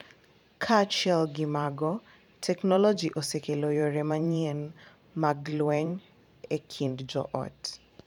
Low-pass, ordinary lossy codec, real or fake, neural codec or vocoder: 19.8 kHz; none; fake; vocoder, 44.1 kHz, 128 mel bands every 512 samples, BigVGAN v2